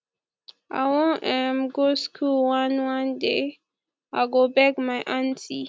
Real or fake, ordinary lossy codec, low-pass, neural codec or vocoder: real; none; none; none